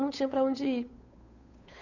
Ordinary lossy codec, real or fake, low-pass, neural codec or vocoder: none; fake; 7.2 kHz; codec, 16 kHz, 8 kbps, FunCodec, trained on Chinese and English, 25 frames a second